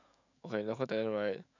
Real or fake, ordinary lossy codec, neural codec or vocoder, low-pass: fake; none; autoencoder, 48 kHz, 128 numbers a frame, DAC-VAE, trained on Japanese speech; 7.2 kHz